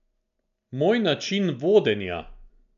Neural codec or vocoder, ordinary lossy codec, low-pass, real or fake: none; none; 7.2 kHz; real